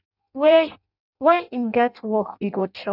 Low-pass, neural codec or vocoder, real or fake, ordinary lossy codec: 5.4 kHz; codec, 16 kHz in and 24 kHz out, 0.6 kbps, FireRedTTS-2 codec; fake; none